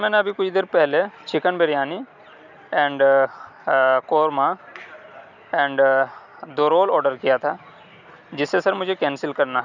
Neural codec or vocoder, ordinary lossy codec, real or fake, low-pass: none; none; real; 7.2 kHz